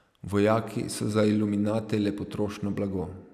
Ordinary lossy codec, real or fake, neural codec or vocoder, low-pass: none; real; none; 14.4 kHz